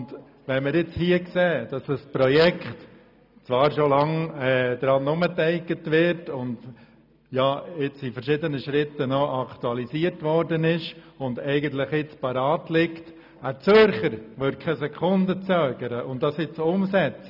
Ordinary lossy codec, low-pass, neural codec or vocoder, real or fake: none; 5.4 kHz; none; real